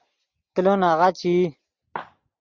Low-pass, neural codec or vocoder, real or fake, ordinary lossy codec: 7.2 kHz; none; real; Opus, 64 kbps